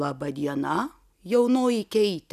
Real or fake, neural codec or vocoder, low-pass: real; none; 14.4 kHz